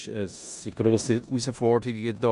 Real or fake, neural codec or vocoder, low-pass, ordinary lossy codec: fake; codec, 16 kHz in and 24 kHz out, 0.9 kbps, LongCat-Audio-Codec, four codebook decoder; 10.8 kHz; none